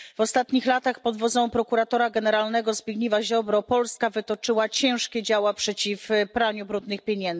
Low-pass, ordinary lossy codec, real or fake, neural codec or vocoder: none; none; real; none